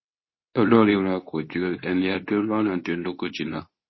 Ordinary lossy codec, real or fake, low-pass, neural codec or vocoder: MP3, 24 kbps; fake; 7.2 kHz; codec, 24 kHz, 0.9 kbps, WavTokenizer, medium speech release version 2